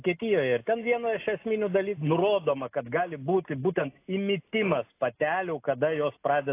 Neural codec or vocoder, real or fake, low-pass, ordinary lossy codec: none; real; 3.6 kHz; AAC, 24 kbps